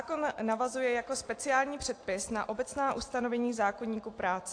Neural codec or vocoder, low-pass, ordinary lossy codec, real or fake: none; 9.9 kHz; AAC, 48 kbps; real